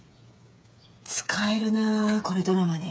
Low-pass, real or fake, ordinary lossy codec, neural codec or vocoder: none; fake; none; codec, 16 kHz, 8 kbps, FreqCodec, smaller model